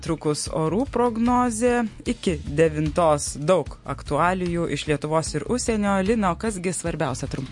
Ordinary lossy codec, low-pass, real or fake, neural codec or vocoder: MP3, 48 kbps; 10.8 kHz; real; none